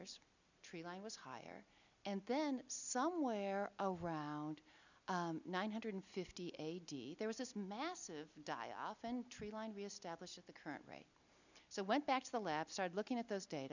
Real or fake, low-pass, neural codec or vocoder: real; 7.2 kHz; none